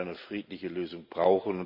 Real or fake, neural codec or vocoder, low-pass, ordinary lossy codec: real; none; 5.4 kHz; none